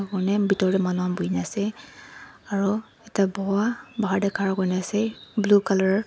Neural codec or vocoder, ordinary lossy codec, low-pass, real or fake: none; none; none; real